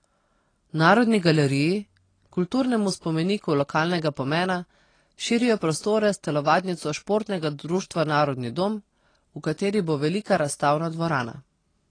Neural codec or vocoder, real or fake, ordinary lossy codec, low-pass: none; real; AAC, 32 kbps; 9.9 kHz